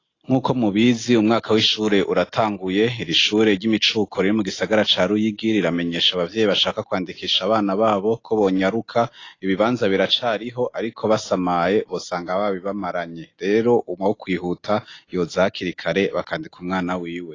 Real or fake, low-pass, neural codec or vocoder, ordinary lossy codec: real; 7.2 kHz; none; AAC, 32 kbps